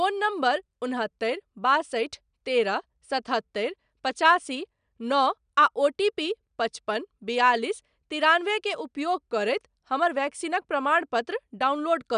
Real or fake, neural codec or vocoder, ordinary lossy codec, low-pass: real; none; none; 9.9 kHz